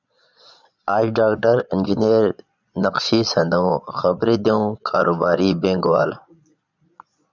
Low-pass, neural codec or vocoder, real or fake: 7.2 kHz; vocoder, 22.05 kHz, 80 mel bands, Vocos; fake